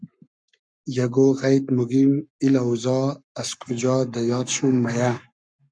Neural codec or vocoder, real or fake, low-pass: codec, 44.1 kHz, 7.8 kbps, Pupu-Codec; fake; 9.9 kHz